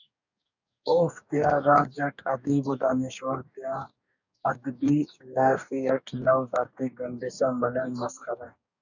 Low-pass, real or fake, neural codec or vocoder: 7.2 kHz; fake; codec, 44.1 kHz, 2.6 kbps, DAC